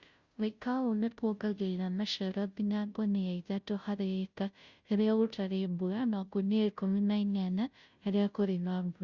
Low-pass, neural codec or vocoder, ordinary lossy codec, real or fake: 7.2 kHz; codec, 16 kHz, 0.5 kbps, FunCodec, trained on Chinese and English, 25 frames a second; Opus, 64 kbps; fake